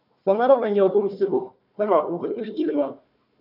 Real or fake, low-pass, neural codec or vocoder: fake; 5.4 kHz; codec, 16 kHz, 1 kbps, FunCodec, trained on Chinese and English, 50 frames a second